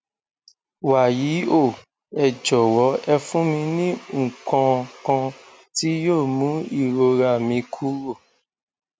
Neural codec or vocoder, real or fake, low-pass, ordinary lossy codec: none; real; none; none